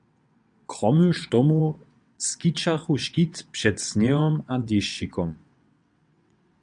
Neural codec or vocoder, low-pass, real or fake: vocoder, 22.05 kHz, 80 mel bands, WaveNeXt; 9.9 kHz; fake